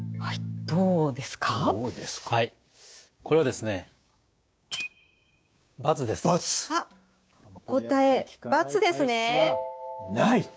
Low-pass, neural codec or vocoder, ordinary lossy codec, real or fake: none; codec, 16 kHz, 6 kbps, DAC; none; fake